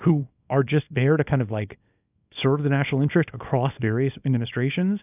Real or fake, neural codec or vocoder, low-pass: fake; codec, 24 kHz, 0.9 kbps, WavTokenizer, small release; 3.6 kHz